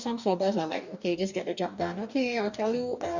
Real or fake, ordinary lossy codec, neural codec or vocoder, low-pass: fake; none; codec, 44.1 kHz, 2.6 kbps, DAC; 7.2 kHz